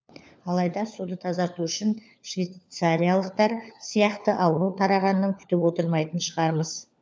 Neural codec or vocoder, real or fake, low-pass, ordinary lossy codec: codec, 16 kHz, 4 kbps, FunCodec, trained on LibriTTS, 50 frames a second; fake; none; none